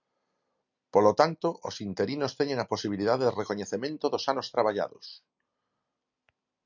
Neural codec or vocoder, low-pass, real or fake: none; 7.2 kHz; real